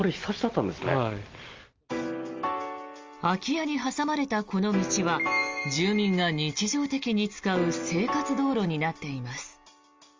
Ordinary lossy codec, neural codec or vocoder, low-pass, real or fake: Opus, 32 kbps; none; 7.2 kHz; real